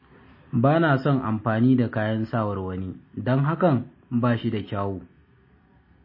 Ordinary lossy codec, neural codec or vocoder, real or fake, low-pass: MP3, 24 kbps; none; real; 5.4 kHz